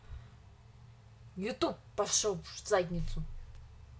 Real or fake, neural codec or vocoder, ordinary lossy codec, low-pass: real; none; none; none